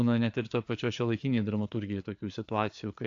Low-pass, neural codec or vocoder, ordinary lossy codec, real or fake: 7.2 kHz; codec, 16 kHz, 4 kbps, FreqCodec, larger model; AAC, 64 kbps; fake